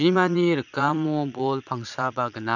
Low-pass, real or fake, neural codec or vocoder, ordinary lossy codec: 7.2 kHz; fake; vocoder, 22.05 kHz, 80 mel bands, Vocos; none